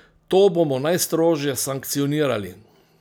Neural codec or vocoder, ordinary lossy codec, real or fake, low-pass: none; none; real; none